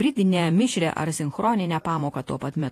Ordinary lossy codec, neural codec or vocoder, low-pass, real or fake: AAC, 48 kbps; vocoder, 48 kHz, 128 mel bands, Vocos; 14.4 kHz; fake